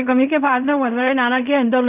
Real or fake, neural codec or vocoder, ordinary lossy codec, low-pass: fake; codec, 16 kHz in and 24 kHz out, 0.4 kbps, LongCat-Audio-Codec, fine tuned four codebook decoder; none; 3.6 kHz